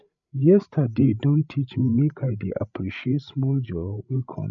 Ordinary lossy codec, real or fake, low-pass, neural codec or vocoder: none; fake; 7.2 kHz; codec, 16 kHz, 8 kbps, FreqCodec, larger model